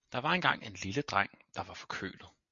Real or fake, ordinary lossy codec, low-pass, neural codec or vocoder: real; MP3, 48 kbps; 7.2 kHz; none